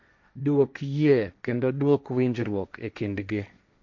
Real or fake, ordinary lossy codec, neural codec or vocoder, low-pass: fake; none; codec, 16 kHz, 1.1 kbps, Voila-Tokenizer; 7.2 kHz